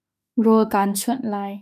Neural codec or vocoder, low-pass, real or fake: autoencoder, 48 kHz, 32 numbers a frame, DAC-VAE, trained on Japanese speech; 14.4 kHz; fake